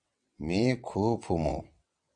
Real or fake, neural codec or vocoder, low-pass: fake; vocoder, 22.05 kHz, 80 mel bands, WaveNeXt; 9.9 kHz